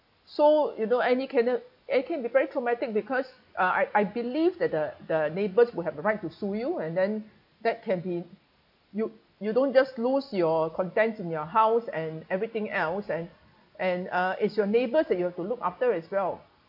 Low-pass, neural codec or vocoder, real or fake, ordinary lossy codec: 5.4 kHz; none; real; AAC, 48 kbps